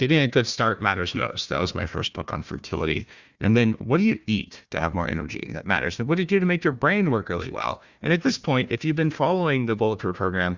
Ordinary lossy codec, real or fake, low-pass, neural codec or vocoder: Opus, 64 kbps; fake; 7.2 kHz; codec, 16 kHz, 1 kbps, FunCodec, trained on Chinese and English, 50 frames a second